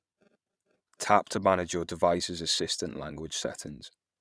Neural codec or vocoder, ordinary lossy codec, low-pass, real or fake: vocoder, 22.05 kHz, 80 mel bands, Vocos; none; none; fake